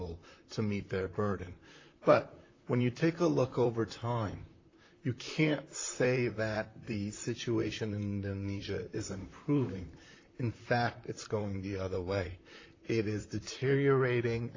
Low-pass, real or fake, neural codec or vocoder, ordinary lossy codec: 7.2 kHz; fake; vocoder, 44.1 kHz, 128 mel bands, Pupu-Vocoder; AAC, 32 kbps